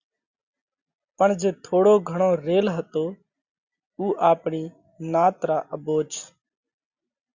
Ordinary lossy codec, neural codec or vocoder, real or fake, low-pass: Opus, 64 kbps; none; real; 7.2 kHz